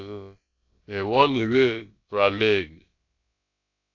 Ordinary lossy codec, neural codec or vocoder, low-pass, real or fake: AAC, 48 kbps; codec, 16 kHz, about 1 kbps, DyCAST, with the encoder's durations; 7.2 kHz; fake